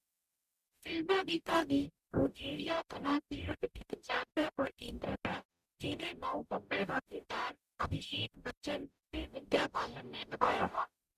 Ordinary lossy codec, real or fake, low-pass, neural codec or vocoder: Opus, 64 kbps; fake; 14.4 kHz; codec, 44.1 kHz, 0.9 kbps, DAC